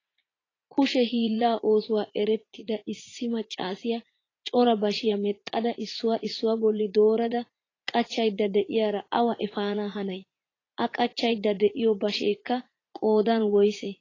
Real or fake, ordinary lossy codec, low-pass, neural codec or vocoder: fake; AAC, 32 kbps; 7.2 kHz; vocoder, 44.1 kHz, 80 mel bands, Vocos